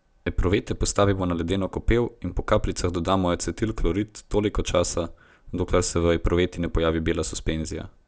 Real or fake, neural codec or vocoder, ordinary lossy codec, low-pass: real; none; none; none